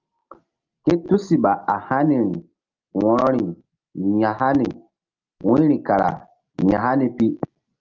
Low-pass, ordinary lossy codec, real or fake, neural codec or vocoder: 7.2 kHz; Opus, 32 kbps; real; none